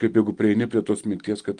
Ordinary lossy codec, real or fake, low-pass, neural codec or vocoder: Opus, 24 kbps; real; 9.9 kHz; none